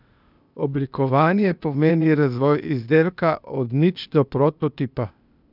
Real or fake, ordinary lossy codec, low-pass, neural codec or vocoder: fake; none; 5.4 kHz; codec, 16 kHz, 0.8 kbps, ZipCodec